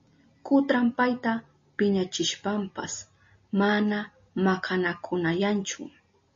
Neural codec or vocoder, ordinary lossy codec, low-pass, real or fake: none; MP3, 32 kbps; 7.2 kHz; real